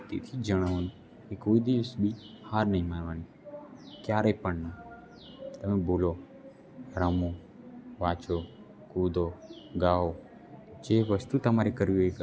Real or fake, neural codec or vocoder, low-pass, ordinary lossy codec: real; none; none; none